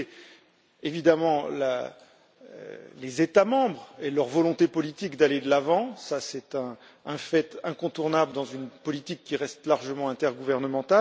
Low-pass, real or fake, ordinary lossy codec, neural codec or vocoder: none; real; none; none